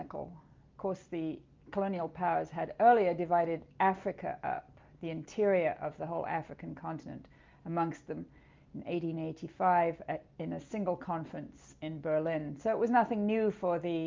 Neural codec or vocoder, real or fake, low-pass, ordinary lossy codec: none; real; 7.2 kHz; Opus, 24 kbps